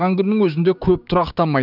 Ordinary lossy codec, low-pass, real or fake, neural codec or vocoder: none; 5.4 kHz; fake; codec, 16 kHz, 6 kbps, DAC